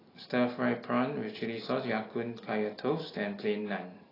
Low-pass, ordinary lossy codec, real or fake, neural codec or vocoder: 5.4 kHz; AAC, 24 kbps; real; none